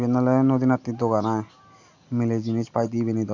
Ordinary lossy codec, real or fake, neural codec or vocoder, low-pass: AAC, 48 kbps; real; none; 7.2 kHz